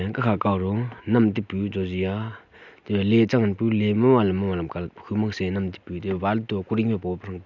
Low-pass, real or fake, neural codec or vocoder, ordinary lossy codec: 7.2 kHz; real; none; none